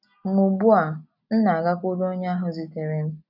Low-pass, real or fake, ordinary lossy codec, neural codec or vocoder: 5.4 kHz; real; none; none